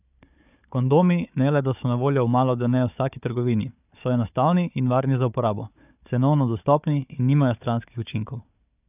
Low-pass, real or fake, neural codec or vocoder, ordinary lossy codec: 3.6 kHz; fake; codec, 16 kHz, 4 kbps, FunCodec, trained on Chinese and English, 50 frames a second; none